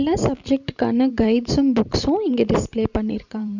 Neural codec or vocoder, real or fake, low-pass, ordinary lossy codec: vocoder, 44.1 kHz, 128 mel bands every 512 samples, BigVGAN v2; fake; 7.2 kHz; none